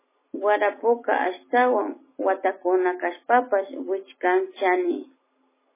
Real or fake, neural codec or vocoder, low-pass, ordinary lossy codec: real; none; 3.6 kHz; MP3, 16 kbps